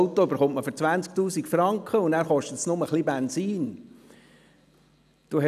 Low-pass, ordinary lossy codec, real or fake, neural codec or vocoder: 14.4 kHz; none; real; none